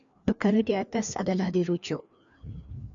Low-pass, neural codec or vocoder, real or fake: 7.2 kHz; codec, 16 kHz, 2 kbps, FreqCodec, larger model; fake